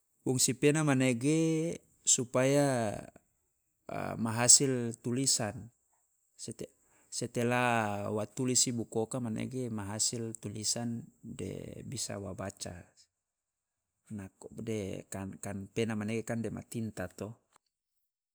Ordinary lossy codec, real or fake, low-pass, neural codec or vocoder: none; real; none; none